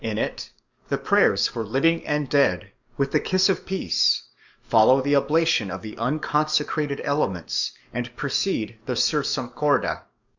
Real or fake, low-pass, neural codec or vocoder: fake; 7.2 kHz; codec, 44.1 kHz, 7.8 kbps, DAC